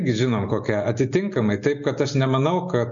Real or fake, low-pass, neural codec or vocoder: real; 7.2 kHz; none